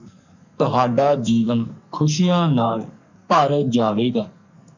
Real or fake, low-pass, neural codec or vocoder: fake; 7.2 kHz; codec, 44.1 kHz, 2.6 kbps, SNAC